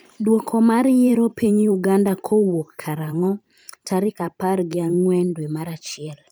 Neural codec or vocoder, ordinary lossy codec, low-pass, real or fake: vocoder, 44.1 kHz, 128 mel bands every 512 samples, BigVGAN v2; none; none; fake